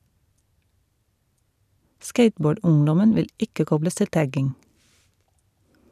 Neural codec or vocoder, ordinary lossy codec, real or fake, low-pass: vocoder, 44.1 kHz, 128 mel bands every 256 samples, BigVGAN v2; none; fake; 14.4 kHz